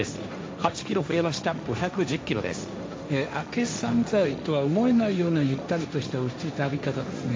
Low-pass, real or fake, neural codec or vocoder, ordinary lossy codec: none; fake; codec, 16 kHz, 1.1 kbps, Voila-Tokenizer; none